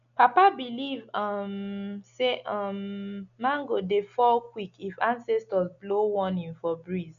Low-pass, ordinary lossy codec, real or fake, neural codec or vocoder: 7.2 kHz; none; real; none